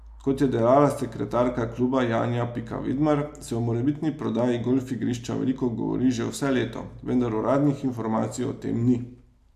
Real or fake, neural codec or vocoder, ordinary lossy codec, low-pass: real; none; AAC, 96 kbps; 14.4 kHz